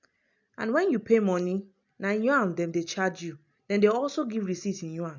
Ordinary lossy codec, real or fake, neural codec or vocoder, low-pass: none; real; none; 7.2 kHz